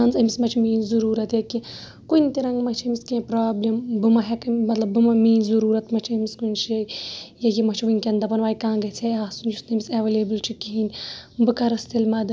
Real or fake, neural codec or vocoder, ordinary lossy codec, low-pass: real; none; none; none